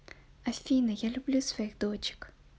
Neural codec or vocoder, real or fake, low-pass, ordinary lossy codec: none; real; none; none